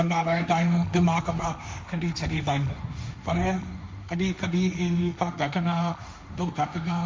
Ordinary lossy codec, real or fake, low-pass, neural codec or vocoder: none; fake; none; codec, 16 kHz, 1.1 kbps, Voila-Tokenizer